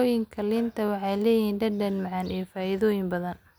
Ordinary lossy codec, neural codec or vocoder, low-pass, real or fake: none; none; none; real